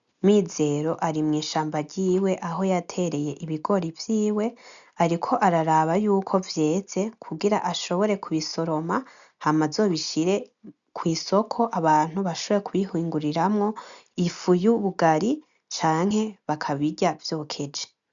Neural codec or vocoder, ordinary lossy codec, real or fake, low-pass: none; MP3, 96 kbps; real; 7.2 kHz